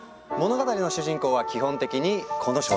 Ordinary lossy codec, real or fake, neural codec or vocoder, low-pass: none; real; none; none